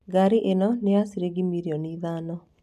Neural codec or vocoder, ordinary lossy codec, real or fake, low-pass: none; none; real; 14.4 kHz